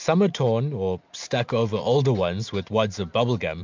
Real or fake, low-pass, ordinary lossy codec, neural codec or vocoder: real; 7.2 kHz; MP3, 64 kbps; none